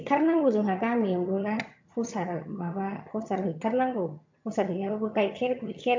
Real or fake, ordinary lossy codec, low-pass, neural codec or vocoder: fake; MP3, 48 kbps; 7.2 kHz; vocoder, 22.05 kHz, 80 mel bands, HiFi-GAN